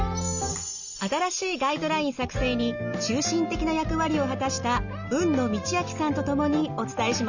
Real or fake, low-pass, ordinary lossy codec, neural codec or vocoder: real; 7.2 kHz; none; none